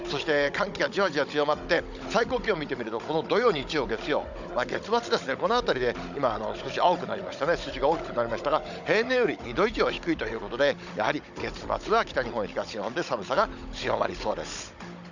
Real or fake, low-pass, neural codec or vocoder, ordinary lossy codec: fake; 7.2 kHz; codec, 16 kHz, 16 kbps, FunCodec, trained on Chinese and English, 50 frames a second; none